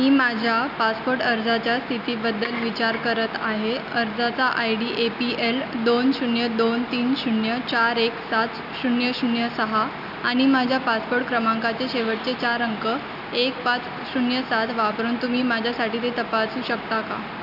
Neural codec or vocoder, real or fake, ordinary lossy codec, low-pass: none; real; none; 5.4 kHz